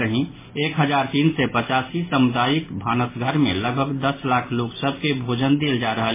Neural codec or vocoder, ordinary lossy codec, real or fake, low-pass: none; MP3, 16 kbps; real; 3.6 kHz